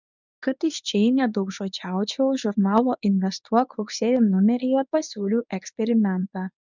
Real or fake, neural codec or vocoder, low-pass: fake; codec, 24 kHz, 0.9 kbps, WavTokenizer, medium speech release version 1; 7.2 kHz